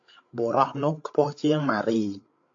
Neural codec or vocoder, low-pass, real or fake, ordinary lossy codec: codec, 16 kHz, 8 kbps, FreqCodec, larger model; 7.2 kHz; fake; AAC, 48 kbps